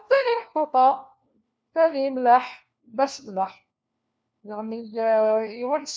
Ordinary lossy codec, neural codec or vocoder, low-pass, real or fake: none; codec, 16 kHz, 1 kbps, FunCodec, trained on LibriTTS, 50 frames a second; none; fake